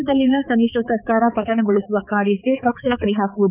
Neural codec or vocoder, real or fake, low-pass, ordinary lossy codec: codec, 16 kHz, 4 kbps, X-Codec, HuBERT features, trained on balanced general audio; fake; 3.6 kHz; none